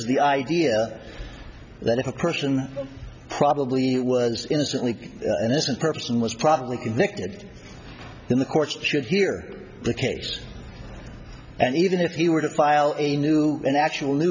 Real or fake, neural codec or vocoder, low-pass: real; none; 7.2 kHz